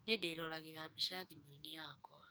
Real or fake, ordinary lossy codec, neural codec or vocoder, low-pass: fake; none; codec, 44.1 kHz, 2.6 kbps, SNAC; none